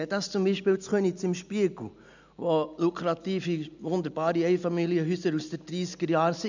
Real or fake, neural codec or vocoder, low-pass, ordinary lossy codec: real; none; 7.2 kHz; none